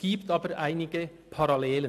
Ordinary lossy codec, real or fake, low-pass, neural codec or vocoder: AAC, 64 kbps; real; 14.4 kHz; none